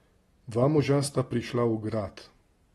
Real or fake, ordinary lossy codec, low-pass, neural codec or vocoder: real; AAC, 32 kbps; 19.8 kHz; none